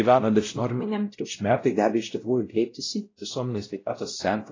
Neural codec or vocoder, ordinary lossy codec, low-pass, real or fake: codec, 16 kHz, 0.5 kbps, X-Codec, WavLM features, trained on Multilingual LibriSpeech; AAC, 32 kbps; 7.2 kHz; fake